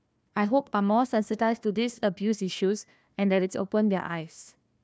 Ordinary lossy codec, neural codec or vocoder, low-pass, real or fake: none; codec, 16 kHz, 1 kbps, FunCodec, trained on Chinese and English, 50 frames a second; none; fake